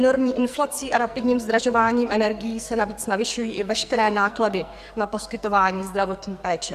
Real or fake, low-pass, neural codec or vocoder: fake; 14.4 kHz; codec, 44.1 kHz, 2.6 kbps, SNAC